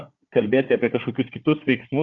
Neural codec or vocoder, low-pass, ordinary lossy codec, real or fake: codec, 16 kHz, 4 kbps, FunCodec, trained on Chinese and English, 50 frames a second; 7.2 kHz; Opus, 64 kbps; fake